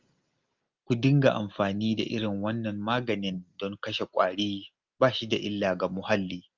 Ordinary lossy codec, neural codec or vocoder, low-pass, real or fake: Opus, 24 kbps; none; 7.2 kHz; real